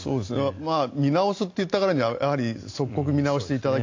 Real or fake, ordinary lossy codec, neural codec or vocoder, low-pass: real; AAC, 48 kbps; none; 7.2 kHz